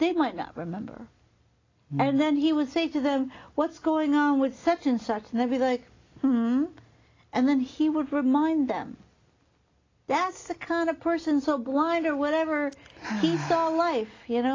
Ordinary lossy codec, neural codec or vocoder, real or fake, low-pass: AAC, 32 kbps; none; real; 7.2 kHz